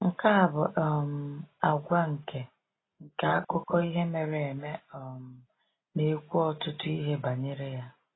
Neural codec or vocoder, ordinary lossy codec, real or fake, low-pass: none; AAC, 16 kbps; real; 7.2 kHz